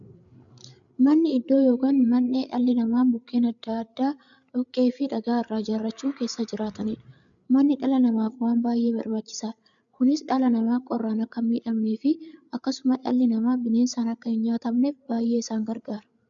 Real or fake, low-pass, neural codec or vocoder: fake; 7.2 kHz; codec, 16 kHz, 8 kbps, FreqCodec, larger model